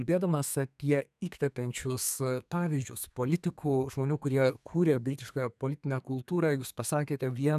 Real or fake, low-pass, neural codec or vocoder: fake; 14.4 kHz; codec, 32 kHz, 1.9 kbps, SNAC